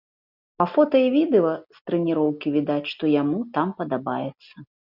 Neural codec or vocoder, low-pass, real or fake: none; 5.4 kHz; real